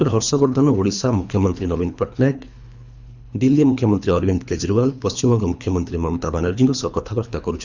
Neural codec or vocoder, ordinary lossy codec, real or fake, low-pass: codec, 24 kHz, 3 kbps, HILCodec; none; fake; 7.2 kHz